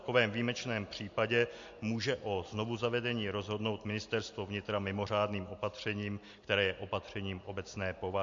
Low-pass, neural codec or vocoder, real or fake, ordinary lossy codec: 7.2 kHz; none; real; MP3, 48 kbps